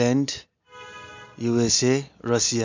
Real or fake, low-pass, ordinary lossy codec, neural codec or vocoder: real; 7.2 kHz; MP3, 64 kbps; none